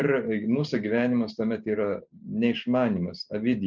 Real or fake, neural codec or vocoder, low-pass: real; none; 7.2 kHz